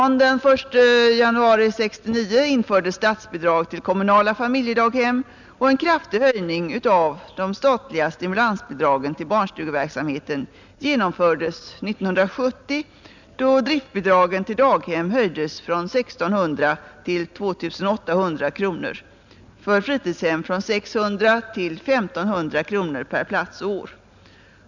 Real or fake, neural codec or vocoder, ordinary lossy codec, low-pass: real; none; none; 7.2 kHz